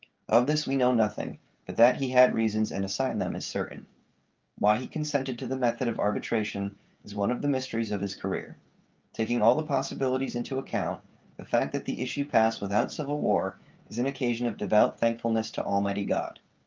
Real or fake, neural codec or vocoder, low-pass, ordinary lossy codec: fake; codec, 16 kHz, 16 kbps, FreqCodec, smaller model; 7.2 kHz; Opus, 24 kbps